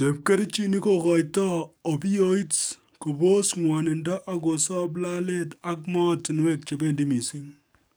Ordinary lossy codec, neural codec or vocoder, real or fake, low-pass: none; codec, 44.1 kHz, 7.8 kbps, DAC; fake; none